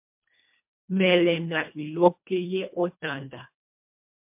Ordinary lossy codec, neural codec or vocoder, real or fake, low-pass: MP3, 32 kbps; codec, 24 kHz, 1.5 kbps, HILCodec; fake; 3.6 kHz